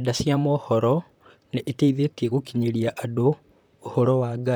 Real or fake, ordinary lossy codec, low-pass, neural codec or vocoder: fake; none; none; vocoder, 44.1 kHz, 128 mel bands, Pupu-Vocoder